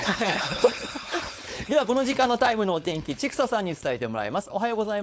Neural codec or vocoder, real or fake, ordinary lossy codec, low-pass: codec, 16 kHz, 4.8 kbps, FACodec; fake; none; none